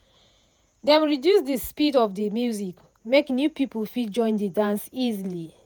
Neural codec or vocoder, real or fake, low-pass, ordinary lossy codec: vocoder, 48 kHz, 128 mel bands, Vocos; fake; none; none